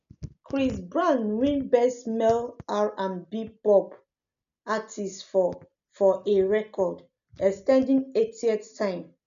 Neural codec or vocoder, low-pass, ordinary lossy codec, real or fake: none; 7.2 kHz; MP3, 96 kbps; real